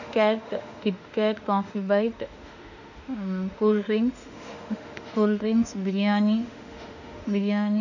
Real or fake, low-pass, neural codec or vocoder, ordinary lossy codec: fake; 7.2 kHz; autoencoder, 48 kHz, 32 numbers a frame, DAC-VAE, trained on Japanese speech; none